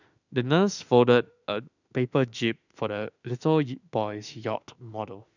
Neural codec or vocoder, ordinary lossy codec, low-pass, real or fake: autoencoder, 48 kHz, 32 numbers a frame, DAC-VAE, trained on Japanese speech; none; 7.2 kHz; fake